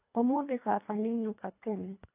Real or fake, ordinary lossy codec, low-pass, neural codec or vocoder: fake; AAC, 32 kbps; 3.6 kHz; codec, 24 kHz, 1.5 kbps, HILCodec